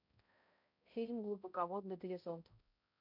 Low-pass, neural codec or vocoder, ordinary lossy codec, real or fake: 5.4 kHz; codec, 16 kHz, 0.5 kbps, X-Codec, HuBERT features, trained on balanced general audio; MP3, 48 kbps; fake